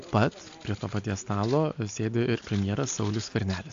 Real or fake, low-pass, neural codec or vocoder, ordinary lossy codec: real; 7.2 kHz; none; AAC, 64 kbps